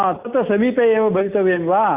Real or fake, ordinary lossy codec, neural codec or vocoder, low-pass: real; Opus, 64 kbps; none; 3.6 kHz